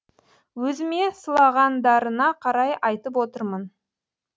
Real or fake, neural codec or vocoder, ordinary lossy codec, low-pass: real; none; none; none